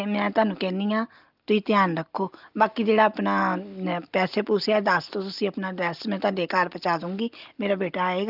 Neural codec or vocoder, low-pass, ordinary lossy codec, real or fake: none; 5.4 kHz; Opus, 32 kbps; real